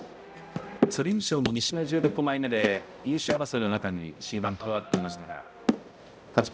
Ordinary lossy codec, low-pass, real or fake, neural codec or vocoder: none; none; fake; codec, 16 kHz, 0.5 kbps, X-Codec, HuBERT features, trained on balanced general audio